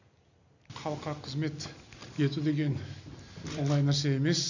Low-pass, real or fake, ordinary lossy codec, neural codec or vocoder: 7.2 kHz; real; none; none